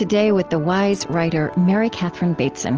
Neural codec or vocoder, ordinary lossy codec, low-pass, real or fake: none; Opus, 16 kbps; 7.2 kHz; real